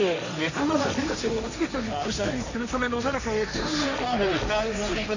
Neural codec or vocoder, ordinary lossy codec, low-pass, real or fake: codec, 16 kHz, 1.1 kbps, Voila-Tokenizer; none; 7.2 kHz; fake